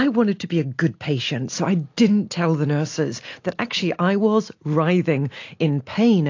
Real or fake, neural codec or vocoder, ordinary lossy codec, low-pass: real; none; AAC, 48 kbps; 7.2 kHz